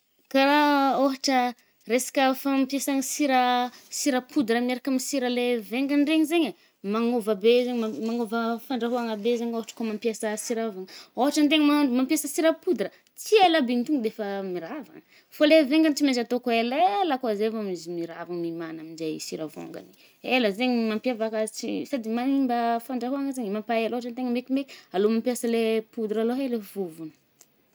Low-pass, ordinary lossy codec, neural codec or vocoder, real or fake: none; none; none; real